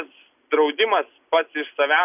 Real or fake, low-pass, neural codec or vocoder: real; 3.6 kHz; none